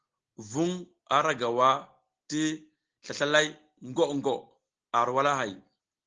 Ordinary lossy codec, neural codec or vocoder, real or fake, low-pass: Opus, 16 kbps; none; real; 7.2 kHz